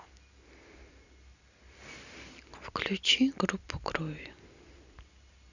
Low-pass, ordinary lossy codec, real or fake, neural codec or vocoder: 7.2 kHz; none; real; none